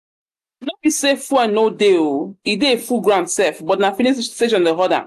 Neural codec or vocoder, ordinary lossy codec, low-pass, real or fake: none; AAC, 96 kbps; 14.4 kHz; real